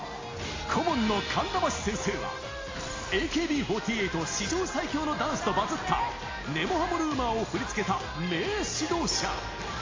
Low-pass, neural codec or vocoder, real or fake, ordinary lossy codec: 7.2 kHz; none; real; AAC, 32 kbps